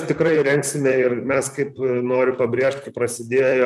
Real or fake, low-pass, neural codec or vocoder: fake; 14.4 kHz; vocoder, 44.1 kHz, 128 mel bands, Pupu-Vocoder